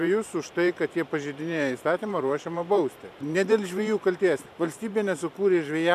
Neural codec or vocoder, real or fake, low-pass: vocoder, 44.1 kHz, 128 mel bands every 512 samples, BigVGAN v2; fake; 14.4 kHz